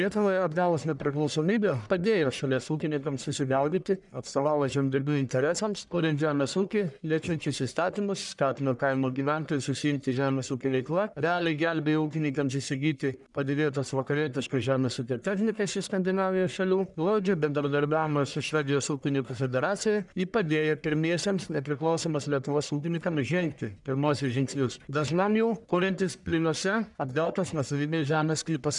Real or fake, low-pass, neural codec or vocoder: fake; 10.8 kHz; codec, 44.1 kHz, 1.7 kbps, Pupu-Codec